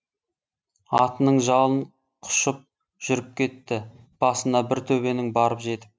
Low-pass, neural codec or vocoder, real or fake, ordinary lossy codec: none; none; real; none